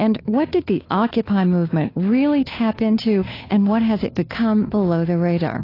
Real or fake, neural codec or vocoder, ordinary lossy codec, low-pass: fake; codec, 16 kHz, 2 kbps, FunCodec, trained on Chinese and English, 25 frames a second; AAC, 24 kbps; 5.4 kHz